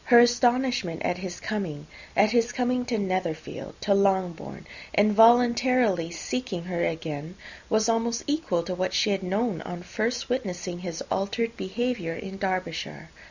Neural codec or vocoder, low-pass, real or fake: none; 7.2 kHz; real